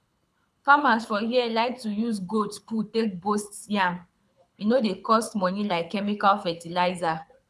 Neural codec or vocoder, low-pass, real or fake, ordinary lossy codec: codec, 24 kHz, 6 kbps, HILCodec; none; fake; none